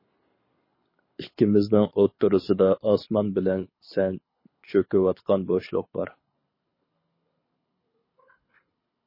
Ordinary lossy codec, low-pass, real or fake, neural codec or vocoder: MP3, 24 kbps; 5.4 kHz; fake; codec, 24 kHz, 6 kbps, HILCodec